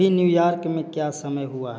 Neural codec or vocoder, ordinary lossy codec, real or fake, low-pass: none; none; real; none